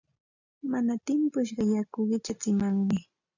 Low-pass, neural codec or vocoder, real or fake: 7.2 kHz; none; real